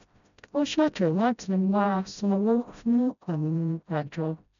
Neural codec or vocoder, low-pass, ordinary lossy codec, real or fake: codec, 16 kHz, 0.5 kbps, FreqCodec, smaller model; 7.2 kHz; none; fake